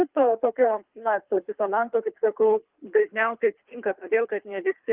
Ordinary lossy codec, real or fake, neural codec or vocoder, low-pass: Opus, 32 kbps; fake; codec, 24 kHz, 3 kbps, HILCodec; 3.6 kHz